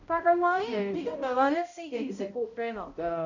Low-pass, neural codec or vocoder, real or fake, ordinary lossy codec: 7.2 kHz; codec, 16 kHz, 0.5 kbps, X-Codec, HuBERT features, trained on balanced general audio; fake; none